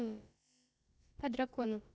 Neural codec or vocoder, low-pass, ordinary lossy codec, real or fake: codec, 16 kHz, about 1 kbps, DyCAST, with the encoder's durations; none; none; fake